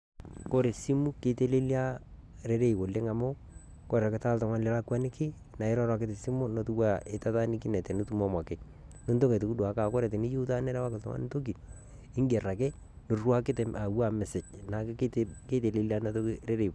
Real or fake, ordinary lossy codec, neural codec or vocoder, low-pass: real; none; none; none